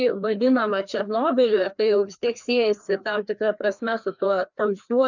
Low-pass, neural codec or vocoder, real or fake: 7.2 kHz; codec, 16 kHz, 2 kbps, FreqCodec, larger model; fake